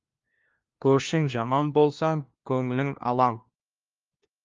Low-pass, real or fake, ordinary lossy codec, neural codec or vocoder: 7.2 kHz; fake; Opus, 32 kbps; codec, 16 kHz, 1 kbps, FunCodec, trained on LibriTTS, 50 frames a second